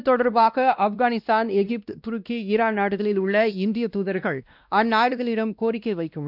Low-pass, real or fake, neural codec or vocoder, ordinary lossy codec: 5.4 kHz; fake; codec, 16 kHz, 1 kbps, X-Codec, WavLM features, trained on Multilingual LibriSpeech; none